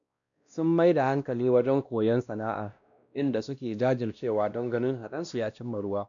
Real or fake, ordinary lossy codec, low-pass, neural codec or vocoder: fake; none; 7.2 kHz; codec, 16 kHz, 1 kbps, X-Codec, WavLM features, trained on Multilingual LibriSpeech